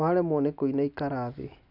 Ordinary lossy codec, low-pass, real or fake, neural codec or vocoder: none; 5.4 kHz; real; none